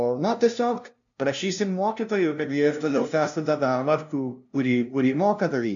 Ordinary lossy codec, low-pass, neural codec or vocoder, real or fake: MP3, 64 kbps; 7.2 kHz; codec, 16 kHz, 0.5 kbps, FunCodec, trained on LibriTTS, 25 frames a second; fake